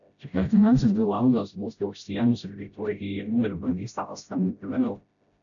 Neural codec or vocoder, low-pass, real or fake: codec, 16 kHz, 0.5 kbps, FreqCodec, smaller model; 7.2 kHz; fake